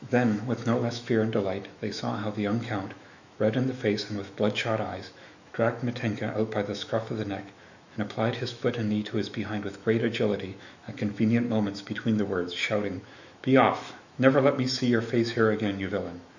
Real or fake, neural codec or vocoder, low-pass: fake; autoencoder, 48 kHz, 128 numbers a frame, DAC-VAE, trained on Japanese speech; 7.2 kHz